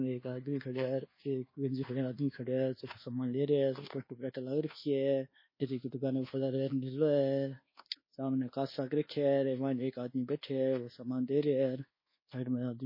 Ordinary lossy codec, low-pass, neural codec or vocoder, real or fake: MP3, 24 kbps; 5.4 kHz; codec, 24 kHz, 1.2 kbps, DualCodec; fake